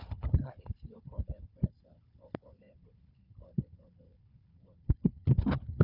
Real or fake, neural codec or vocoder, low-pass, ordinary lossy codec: fake; codec, 16 kHz, 4 kbps, FunCodec, trained on Chinese and English, 50 frames a second; 5.4 kHz; none